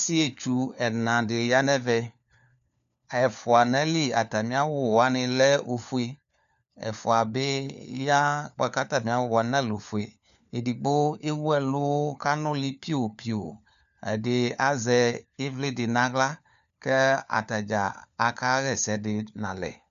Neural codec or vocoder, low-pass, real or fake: codec, 16 kHz, 4 kbps, FunCodec, trained on LibriTTS, 50 frames a second; 7.2 kHz; fake